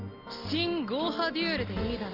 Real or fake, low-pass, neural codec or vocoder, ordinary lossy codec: real; 5.4 kHz; none; Opus, 24 kbps